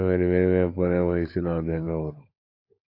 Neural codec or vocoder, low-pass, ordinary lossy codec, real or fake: codec, 16 kHz, 16 kbps, FunCodec, trained on LibriTTS, 50 frames a second; 5.4 kHz; AAC, 32 kbps; fake